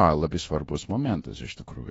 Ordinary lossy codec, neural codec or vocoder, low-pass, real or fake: AAC, 24 kbps; codec, 24 kHz, 1.2 kbps, DualCodec; 10.8 kHz; fake